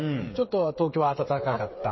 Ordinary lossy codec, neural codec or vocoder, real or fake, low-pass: MP3, 24 kbps; codec, 16 kHz, 8 kbps, FreqCodec, smaller model; fake; 7.2 kHz